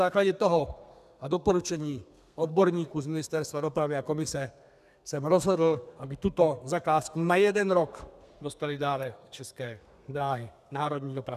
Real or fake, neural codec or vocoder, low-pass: fake; codec, 32 kHz, 1.9 kbps, SNAC; 14.4 kHz